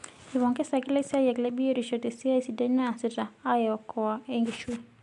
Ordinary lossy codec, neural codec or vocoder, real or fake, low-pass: MP3, 64 kbps; none; real; 10.8 kHz